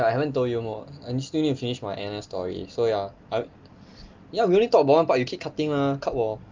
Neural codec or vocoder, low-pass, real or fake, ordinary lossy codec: none; 7.2 kHz; real; Opus, 32 kbps